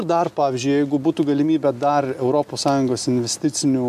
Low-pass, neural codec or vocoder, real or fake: 14.4 kHz; none; real